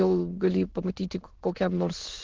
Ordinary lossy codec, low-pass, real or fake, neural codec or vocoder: Opus, 16 kbps; 7.2 kHz; fake; autoencoder, 22.05 kHz, a latent of 192 numbers a frame, VITS, trained on many speakers